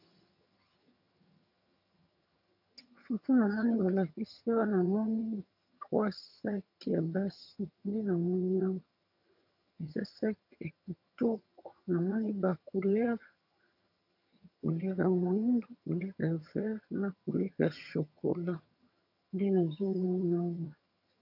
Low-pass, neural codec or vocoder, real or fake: 5.4 kHz; vocoder, 22.05 kHz, 80 mel bands, HiFi-GAN; fake